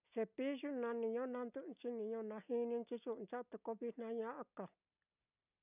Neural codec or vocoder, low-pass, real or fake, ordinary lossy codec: none; 3.6 kHz; real; none